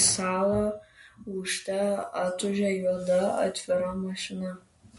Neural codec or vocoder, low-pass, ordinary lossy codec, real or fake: none; 14.4 kHz; MP3, 48 kbps; real